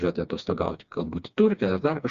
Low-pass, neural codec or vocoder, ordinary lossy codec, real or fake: 7.2 kHz; codec, 16 kHz, 2 kbps, FreqCodec, smaller model; Opus, 64 kbps; fake